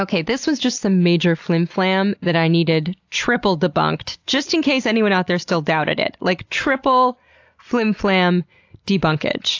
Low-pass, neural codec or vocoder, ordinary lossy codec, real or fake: 7.2 kHz; none; AAC, 48 kbps; real